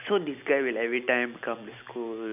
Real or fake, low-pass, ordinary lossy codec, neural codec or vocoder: real; 3.6 kHz; none; none